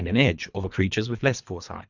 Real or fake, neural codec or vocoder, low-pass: fake; codec, 24 kHz, 3 kbps, HILCodec; 7.2 kHz